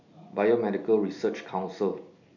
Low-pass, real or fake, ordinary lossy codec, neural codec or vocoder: 7.2 kHz; real; none; none